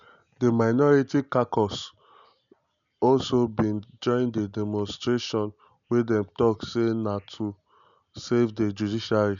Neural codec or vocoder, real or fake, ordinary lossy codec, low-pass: none; real; none; 7.2 kHz